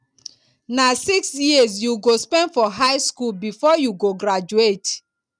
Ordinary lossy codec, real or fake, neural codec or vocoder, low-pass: none; real; none; 9.9 kHz